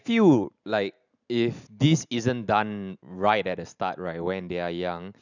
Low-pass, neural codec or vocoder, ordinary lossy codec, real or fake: 7.2 kHz; none; none; real